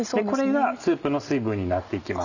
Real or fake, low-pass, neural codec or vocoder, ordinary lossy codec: real; 7.2 kHz; none; none